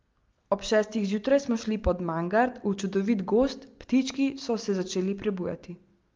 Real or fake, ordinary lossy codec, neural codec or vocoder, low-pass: real; Opus, 24 kbps; none; 7.2 kHz